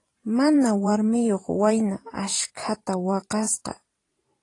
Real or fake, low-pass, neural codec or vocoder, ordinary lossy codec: fake; 10.8 kHz; vocoder, 44.1 kHz, 128 mel bands every 512 samples, BigVGAN v2; AAC, 32 kbps